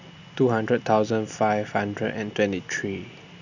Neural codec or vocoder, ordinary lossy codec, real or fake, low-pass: none; none; real; 7.2 kHz